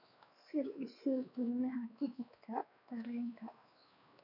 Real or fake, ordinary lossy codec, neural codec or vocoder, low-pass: fake; none; codec, 16 kHz, 2 kbps, X-Codec, WavLM features, trained on Multilingual LibriSpeech; 5.4 kHz